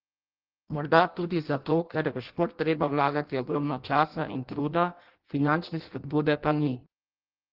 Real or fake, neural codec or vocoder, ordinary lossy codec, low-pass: fake; codec, 16 kHz in and 24 kHz out, 0.6 kbps, FireRedTTS-2 codec; Opus, 16 kbps; 5.4 kHz